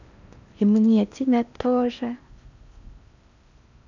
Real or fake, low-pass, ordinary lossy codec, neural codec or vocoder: fake; 7.2 kHz; none; codec, 16 kHz in and 24 kHz out, 0.8 kbps, FocalCodec, streaming, 65536 codes